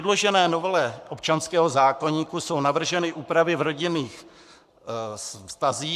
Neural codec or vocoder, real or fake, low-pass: codec, 44.1 kHz, 7.8 kbps, Pupu-Codec; fake; 14.4 kHz